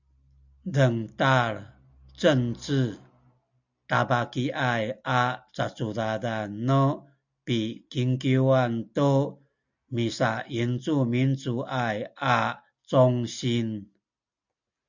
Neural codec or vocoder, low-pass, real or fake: none; 7.2 kHz; real